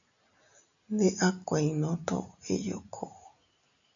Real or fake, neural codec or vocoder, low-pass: real; none; 7.2 kHz